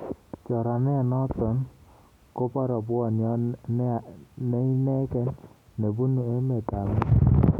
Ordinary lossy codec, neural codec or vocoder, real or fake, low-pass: none; none; real; 19.8 kHz